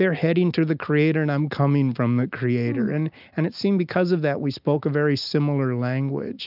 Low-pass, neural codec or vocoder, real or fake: 5.4 kHz; none; real